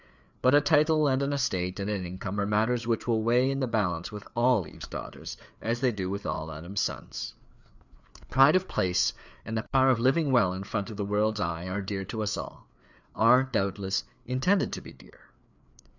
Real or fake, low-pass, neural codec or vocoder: fake; 7.2 kHz; codec, 16 kHz, 4 kbps, FreqCodec, larger model